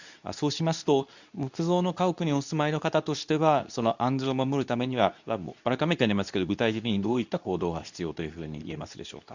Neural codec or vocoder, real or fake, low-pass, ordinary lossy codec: codec, 24 kHz, 0.9 kbps, WavTokenizer, medium speech release version 2; fake; 7.2 kHz; none